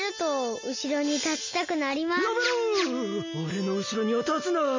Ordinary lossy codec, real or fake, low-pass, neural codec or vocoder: MP3, 32 kbps; real; 7.2 kHz; none